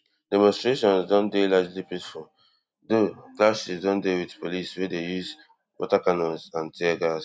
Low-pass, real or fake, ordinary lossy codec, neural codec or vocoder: none; real; none; none